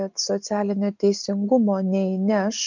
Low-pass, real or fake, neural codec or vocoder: 7.2 kHz; real; none